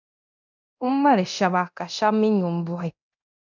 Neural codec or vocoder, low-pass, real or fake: codec, 24 kHz, 0.9 kbps, DualCodec; 7.2 kHz; fake